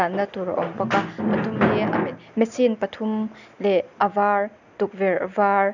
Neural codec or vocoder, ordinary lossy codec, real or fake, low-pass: none; none; real; 7.2 kHz